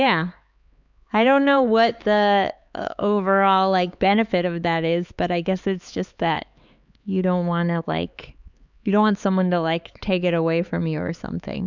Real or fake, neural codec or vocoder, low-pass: fake; codec, 16 kHz, 4 kbps, X-Codec, HuBERT features, trained on LibriSpeech; 7.2 kHz